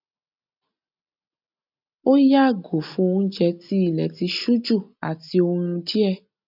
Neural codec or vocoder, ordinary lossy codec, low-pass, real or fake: none; none; 5.4 kHz; real